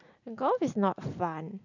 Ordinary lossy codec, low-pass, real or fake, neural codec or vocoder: none; 7.2 kHz; real; none